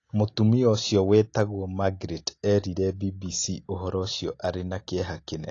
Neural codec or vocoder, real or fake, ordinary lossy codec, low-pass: none; real; AAC, 32 kbps; 7.2 kHz